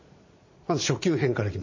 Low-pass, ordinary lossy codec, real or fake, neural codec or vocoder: 7.2 kHz; MP3, 32 kbps; fake; vocoder, 44.1 kHz, 80 mel bands, Vocos